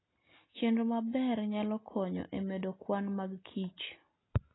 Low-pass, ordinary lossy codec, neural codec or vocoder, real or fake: 7.2 kHz; AAC, 16 kbps; none; real